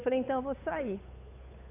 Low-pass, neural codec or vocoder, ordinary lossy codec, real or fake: 3.6 kHz; codec, 16 kHz, 8 kbps, FunCodec, trained on Chinese and English, 25 frames a second; AAC, 24 kbps; fake